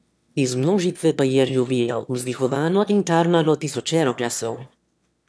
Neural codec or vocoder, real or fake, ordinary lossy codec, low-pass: autoencoder, 22.05 kHz, a latent of 192 numbers a frame, VITS, trained on one speaker; fake; none; none